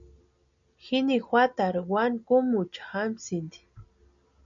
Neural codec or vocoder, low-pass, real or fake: none; 7.2 kHz; real